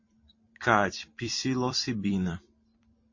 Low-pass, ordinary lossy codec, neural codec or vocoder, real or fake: 7.2 kHz; MP3, 32 kbps; vocoder, 44.1 kHz, 128 mel bands every 256 samples, BigVGAN v2; fake